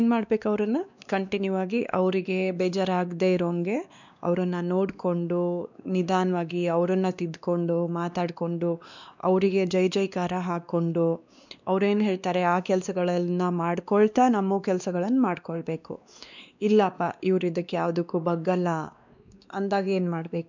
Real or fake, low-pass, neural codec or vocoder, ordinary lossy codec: fake; 7.2 kHz; codec, 16 kHz, 2 kbps, X-Codec, WavLM features, trained on Multilingual LibriSpeech; none